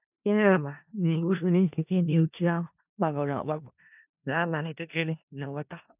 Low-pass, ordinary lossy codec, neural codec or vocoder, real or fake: 3.6 kHz; none; codec, 16 kHz in and 24 kHz out, 0.4 kbps, LongCat-Audio-Codec, four codebook decoder; fake